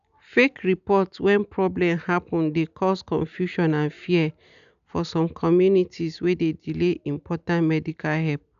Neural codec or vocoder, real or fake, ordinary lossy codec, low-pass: none; real; none; 7.2 kHz